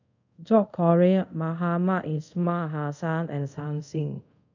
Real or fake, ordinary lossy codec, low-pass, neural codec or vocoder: fake; none; 7.2 kHz; codec, 24 kHz, 0.5 kbps, DualCodec